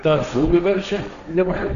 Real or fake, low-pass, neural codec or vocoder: fake; 7.2 kHz; codec, 16 kHz, 1.1 kbps, Voila-Tokenizer